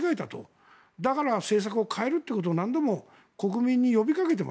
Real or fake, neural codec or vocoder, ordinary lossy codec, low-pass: real; none; none; none